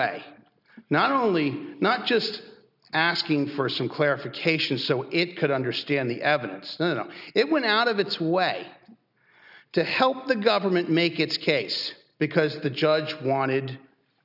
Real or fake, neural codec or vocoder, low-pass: real; none; 5.4 kHz